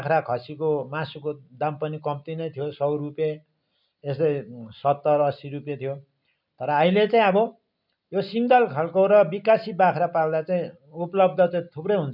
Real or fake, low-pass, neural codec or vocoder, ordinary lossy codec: real; 5.4 kHz; none; none